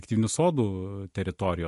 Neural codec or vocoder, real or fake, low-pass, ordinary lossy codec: none; real; 14.4 kHz; MP3, 48 kbps